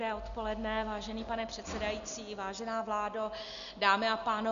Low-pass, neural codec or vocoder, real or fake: 7.2 kHz; none; real